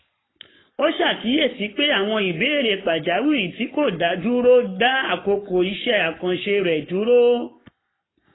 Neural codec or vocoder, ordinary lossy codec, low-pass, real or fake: codec, 44.1 kHz, 7.8 kbps, Pupu-Codec; AAC, 16 kbps; 7.2 kHz; fake